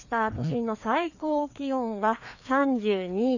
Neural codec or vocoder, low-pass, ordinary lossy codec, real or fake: codec, 16 kHz, 2 kbps, FreqCodec, larger model; 7.2 kHz; none; fake